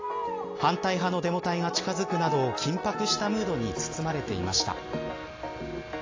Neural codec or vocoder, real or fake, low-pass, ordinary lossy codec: none; real; 7.2 kHz; AAC, 32 kbps